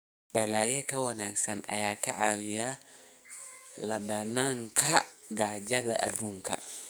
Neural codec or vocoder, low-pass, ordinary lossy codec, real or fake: codec, 44.1 kHz, 2.6 kbps, SNAC; none; none; fake